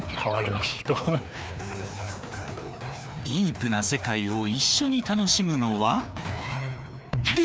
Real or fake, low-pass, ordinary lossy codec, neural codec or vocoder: fake; none; none; codec, 16 kHz, 2 kbps, FreqCodec, larger model